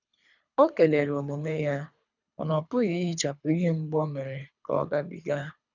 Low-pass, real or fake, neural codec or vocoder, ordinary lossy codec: 7.2 kHz; fake; codec, 24 kHz, 3 kbps, HILCodec; none